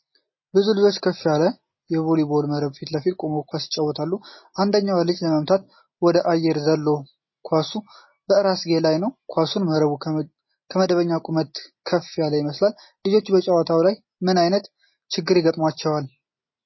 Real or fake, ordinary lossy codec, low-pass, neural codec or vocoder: real; MP3, 24 kbps; 7.2 kHz; none